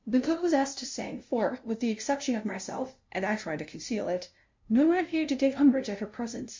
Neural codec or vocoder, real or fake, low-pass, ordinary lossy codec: codec, 16 kHz, 0.5 kbps, FunCodec, trained on LibriTTS, 25 frames a second; fake; 7.2 kHz; MP3, 48 kbps